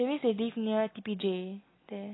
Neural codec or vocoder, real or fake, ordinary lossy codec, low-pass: none; real; AAC, 16 kbps; 7.2 kHz